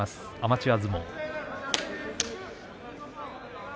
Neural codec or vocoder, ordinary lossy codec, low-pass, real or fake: none; none; none; real